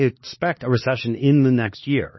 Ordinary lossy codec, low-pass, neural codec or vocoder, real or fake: MP3, 24 kbps; 7.2 kHz; codec, 16 kHz, 2 kbps, X-Codec, HuBERT features, trained on LibriSpeech; fake